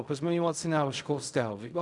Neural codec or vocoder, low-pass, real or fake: codec, 16 kHz in and 24 kHz out, 0.4 kbps, LongCat-Audio-Codec, fine tuned four codebook decoder; 10.8 kHz; fake